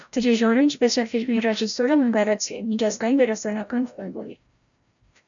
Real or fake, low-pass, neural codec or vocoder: fake; 7.2 kHz; codec, 16 kHz, 0.5 kbps, FreqCodec, larger model